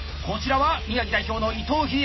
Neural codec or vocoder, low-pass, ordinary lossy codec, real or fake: none; 7.2 kHz; MP3, 24 kbps; real